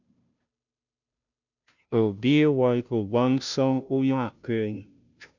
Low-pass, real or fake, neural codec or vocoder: 7.2 kHz; fake; codec, 16 kHz, 0.5 kbps, FunCodec, trained on Chinese and English, 25 frames a second